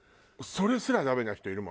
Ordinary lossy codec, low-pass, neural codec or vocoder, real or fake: none; none; none; real